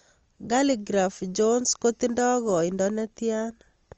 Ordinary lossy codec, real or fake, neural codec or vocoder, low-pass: Opus, 24 kbps; real; none; 7.2 kHz